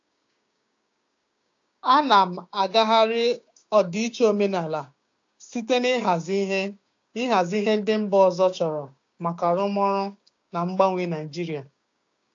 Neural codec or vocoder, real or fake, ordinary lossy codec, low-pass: codec, 16 kHz, 6 kbps, DAC; fake; AAC, 48 kbps; 7.2 kHz